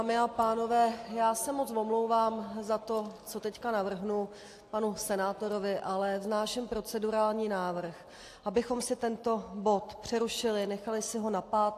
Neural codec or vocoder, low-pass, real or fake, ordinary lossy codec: none; 14.4 kHz; real; AAC, 64 kbps